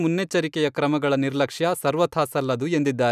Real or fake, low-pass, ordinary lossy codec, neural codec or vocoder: real; 14.4 kHz; none; none